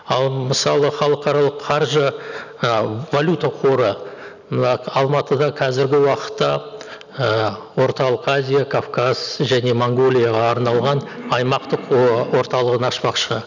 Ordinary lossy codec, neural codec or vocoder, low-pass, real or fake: none; none; 7.2 kHz; real